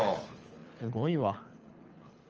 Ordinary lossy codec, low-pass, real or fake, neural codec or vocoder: Opus, 24 kbps; 7.2 kHz; fake; codec, 24 kHz, 6 kbps, HILCodec